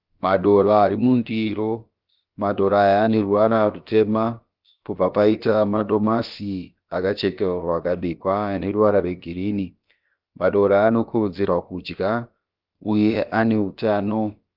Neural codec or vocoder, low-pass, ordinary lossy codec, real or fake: codec, 16 kHz, about 1 kbps, DyCAST, with the encoder's durations; 5.4 kHz; Opus, 32 kbps; fake